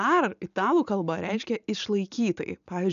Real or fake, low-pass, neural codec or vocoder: real; 7.2 kHz; none